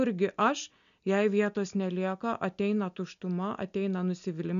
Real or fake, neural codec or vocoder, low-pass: real; none; 7.2 kHz